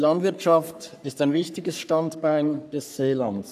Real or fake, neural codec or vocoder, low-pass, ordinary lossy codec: fake; codec, 44.1 kHz, 3.4 kbps, Pupu-Codec; 14.4 kHz; none